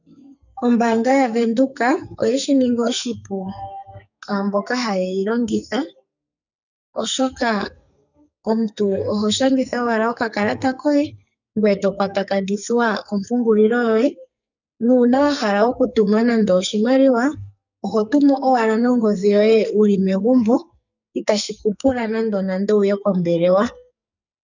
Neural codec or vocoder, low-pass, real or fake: codec, 44.1 kHz, 2.6 kbps, SNAC; 7.2 kHz; fake